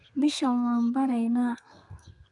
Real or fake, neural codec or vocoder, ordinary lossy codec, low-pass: fake; codec, 32 kHz, 1.9 kbps, SNAC; none; 10.8 kHz